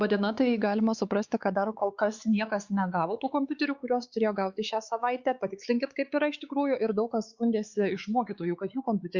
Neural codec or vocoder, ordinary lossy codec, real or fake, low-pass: codec, 16 kHz, 4 kbps, X-Codec, WavLM features, trained on Multilingual LibriSpeech; Opus, 64 kbps; fake; 7.2 kHz